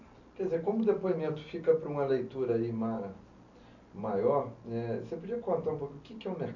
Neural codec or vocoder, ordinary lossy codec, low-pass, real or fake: none; none; 7.2 kHz; real